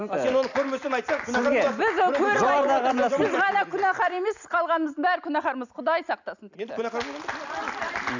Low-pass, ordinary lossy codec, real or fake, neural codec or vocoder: 7.2 kHz; none; real; none